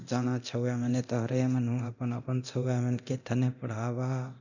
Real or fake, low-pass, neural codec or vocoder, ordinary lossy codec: fake; 7.2 kHz; codec, 24 kHz, 0.9 kbps, DualCodec; none